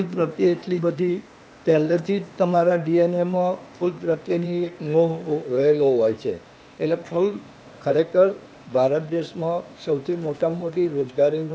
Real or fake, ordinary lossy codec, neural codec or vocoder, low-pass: fake; none; codec, 16 kHz, 0.8 kbps, ZipCodec; none